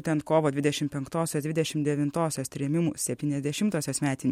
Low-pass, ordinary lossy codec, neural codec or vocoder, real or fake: 19.8 kHz; MP3, 64 kbps; none; real